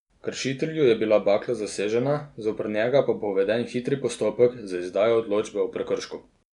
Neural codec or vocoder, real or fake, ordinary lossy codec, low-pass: none; real; none; 10.8 kHz